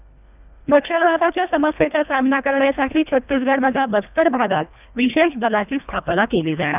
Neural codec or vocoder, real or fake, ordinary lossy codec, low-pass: codec, 24 kHz, 1.5 kbps, HILCodec; fake; none; 3.6 kHz